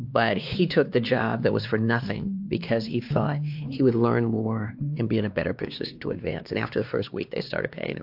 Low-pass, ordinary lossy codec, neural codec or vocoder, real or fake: 5.4 kHz; AAC, 48 kbps; codec, 16 kHz, 2 kbps, X-Codec, WavLM features, trained on Multilingual LibriSpeech; fake